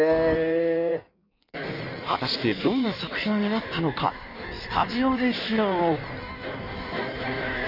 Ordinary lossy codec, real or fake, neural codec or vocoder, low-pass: none; fake; codec, 16 kHz in and 24 kHz out, 1.1 kbps, FireRedTTS-2 codec; 5.4 kHz